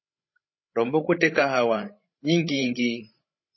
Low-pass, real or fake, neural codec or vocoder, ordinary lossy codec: 7.2 kHz; fake; codec, 16 kHz, 16 kbps, FreqCodec, larger model; MP3, 24 kbps